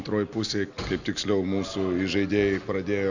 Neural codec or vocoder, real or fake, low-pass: none; real; 7.2 kHz